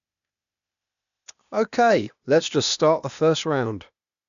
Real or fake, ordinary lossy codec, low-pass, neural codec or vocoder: fake; none; 7.2 kHz; codec, 16 kHz, 0.8 kbps, ZipCodec